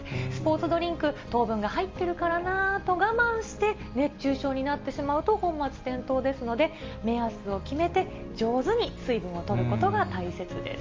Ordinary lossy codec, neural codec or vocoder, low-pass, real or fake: Opus, 32 kbps; none; 7.2 kHz; real